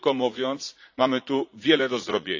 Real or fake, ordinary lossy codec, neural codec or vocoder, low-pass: fake; AAC, 48 kbps; vocoder, 44.1 kHz, 80 mel bands, Vocos; 7.2 kHz